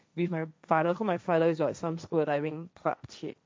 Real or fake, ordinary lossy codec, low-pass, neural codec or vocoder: fake; none; none; codec, 16 kHz, 1.1 kbps, Voila-Tokenizer